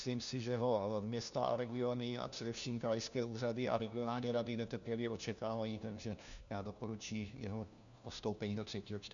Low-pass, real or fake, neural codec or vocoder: 7.2 kHz; fake; codec, 16 kHz, 1 kbps, FunCodec, trained on LibriTTS, 50 frames a second